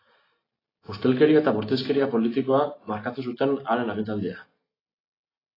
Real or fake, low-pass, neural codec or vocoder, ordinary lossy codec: real; 5.4 kHz; none; AAC, 24 kbps